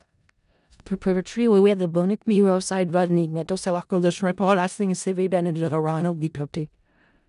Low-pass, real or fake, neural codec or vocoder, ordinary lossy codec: 10.8 kHz; fake; codec, 16 kHz in and 24 kHz out, 0.4 kbps, LongCat-Audio-Codec, four codebook decoder; none